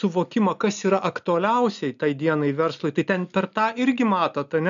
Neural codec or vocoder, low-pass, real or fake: none; 7.2 kHz; real